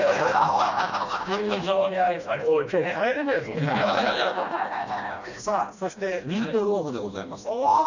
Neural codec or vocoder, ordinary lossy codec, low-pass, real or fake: codec, 16 kHz, 1 kbps, FreqCodec, smaller model; none; 7.2 kHz; fake